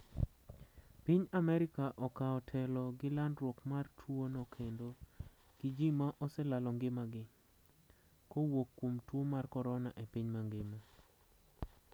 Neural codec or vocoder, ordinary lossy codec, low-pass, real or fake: none; none; none; real